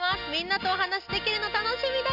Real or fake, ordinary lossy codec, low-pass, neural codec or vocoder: real; none; 5.4 kHz; none